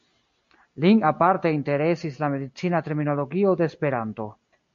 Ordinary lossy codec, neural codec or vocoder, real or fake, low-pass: MP3, 48 kbps; none; real; 7.2 kHz